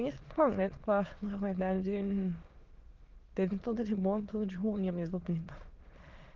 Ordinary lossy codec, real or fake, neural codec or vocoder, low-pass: Opus, 16 kbps; fake; autoencoder, 22.05 kHz, a latent of 192 numbers a frame, VITS, trained on many speakers; 7.2 kHz